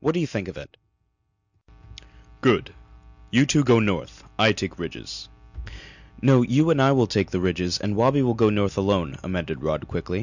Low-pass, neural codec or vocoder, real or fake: 7.2 kHz; none; real